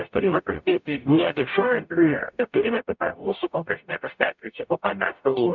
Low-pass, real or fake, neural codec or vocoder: 7.2 kHz; fake; codec, 44.1 kHz, 0.9 kbps, DAC